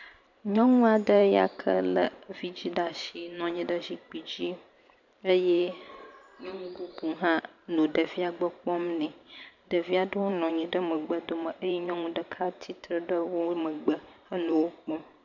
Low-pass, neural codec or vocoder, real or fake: 7.2 kHz; vocoder, 44.1 kHz, 128 mel bands every 512 samples, BigVGAN v2; fake